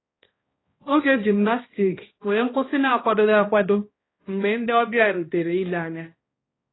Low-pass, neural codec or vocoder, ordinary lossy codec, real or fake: 7.2 kHz; codec, 16 kHz, 1 kbps, X-Codec, HuBERT features, trained on balanced general audio; AAC, 16 kbps; fake